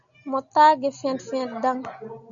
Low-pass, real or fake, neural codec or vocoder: 7.2 kHz; real; none